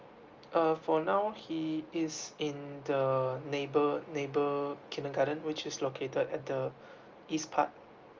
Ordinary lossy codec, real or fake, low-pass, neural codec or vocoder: Opus, 32 kbps; real; 7.2 kHz; none